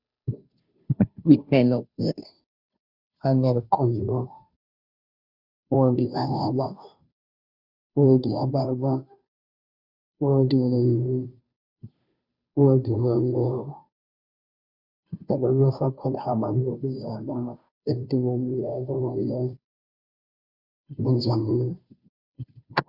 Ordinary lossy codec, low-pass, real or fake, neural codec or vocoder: Opus, 64 kbps; 5.4 kHz; fake; codec, 16 kHz, 0.5 kbps, FunCodec, trained on Chinese and English, 25 frames a second